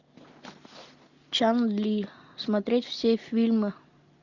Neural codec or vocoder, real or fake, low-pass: none; real; 7.2 kHz